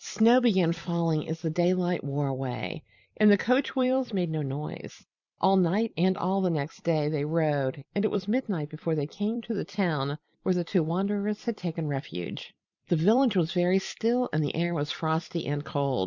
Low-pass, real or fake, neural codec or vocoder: 7.2 kHz; fake; vocoder, 44.1 kHz, 128 mel bands every 256 samples, BigVGAN v2